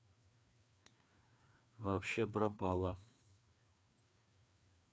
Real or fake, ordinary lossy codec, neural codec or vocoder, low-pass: fake; none; codec, 16 kHz, 2 kbps, FreqCodec, larger model; none